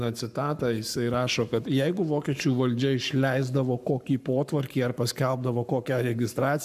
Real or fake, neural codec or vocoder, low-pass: fake; codec, 44.1 kHz, 7.8 kbps, Pupu-Codec; 14.4 kHz